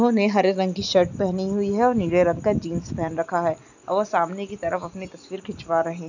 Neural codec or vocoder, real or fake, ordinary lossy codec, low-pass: none; real; none; 7.2 kHz